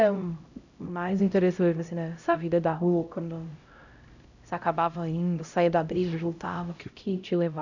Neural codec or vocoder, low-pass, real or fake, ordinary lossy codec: codec, 16 kHz, 0.5 kbps, X-Codec, HuBERT features, trained on LibriSpeech; 7.2 kHz; fake; none